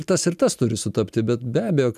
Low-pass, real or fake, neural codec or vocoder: 14.4 kHz; real; none